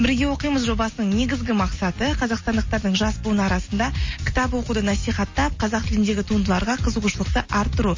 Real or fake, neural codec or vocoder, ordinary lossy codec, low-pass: real; none; MP3, 32 kbps; 7.2 kHz